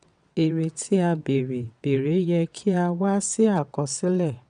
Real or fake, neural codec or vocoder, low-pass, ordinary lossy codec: fake; vocoder, 22.05 kHz, 80 mel bands, WaveNeXt; 9.9 kHz; none